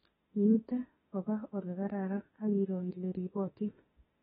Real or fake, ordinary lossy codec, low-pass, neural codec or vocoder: fake; AAC, 16 kbps; 19.8 kHz; autoencoder, 48 kHz, 32 numbers a frame, DAC-VAE, trained on Japanese speech